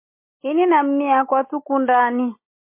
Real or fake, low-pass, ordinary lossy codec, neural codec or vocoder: real; 3.6 kHz; MP3, 24 kbps; none